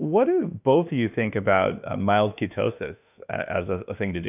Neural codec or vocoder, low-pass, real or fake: autoencoder, 48 kHz, 32 numbers a frame, DAC-VAE, trained on Japanese speech; 3.6 kHz; fake